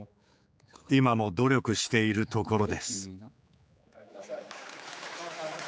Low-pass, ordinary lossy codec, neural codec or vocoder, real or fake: none; none; codec, 16 kHz, 4 kbps, X-Codec, HuBERT features, trained on general audio; fake